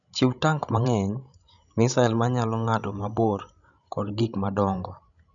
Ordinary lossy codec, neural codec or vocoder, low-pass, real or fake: none; codec, 16 kHz, 16 kbps, FreqCodec, larger model; 7.2 kHz; fake